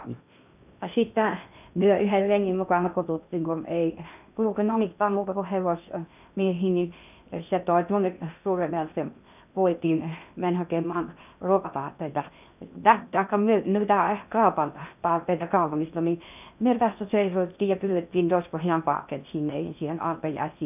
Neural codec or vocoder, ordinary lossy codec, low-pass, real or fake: codec, 16 kHz in and 24 kHz out, 0.6 kbps, FocalCodec, streaming, 2048 codes; none; 3.6 kHz; fake